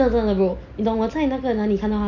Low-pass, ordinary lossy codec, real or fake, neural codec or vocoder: 7.2 kHz; none; real; none